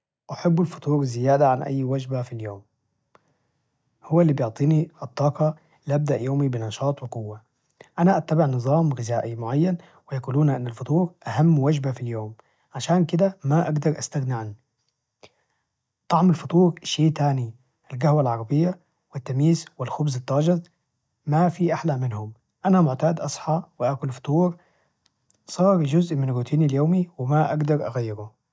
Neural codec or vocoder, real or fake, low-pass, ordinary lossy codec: none; real; none; none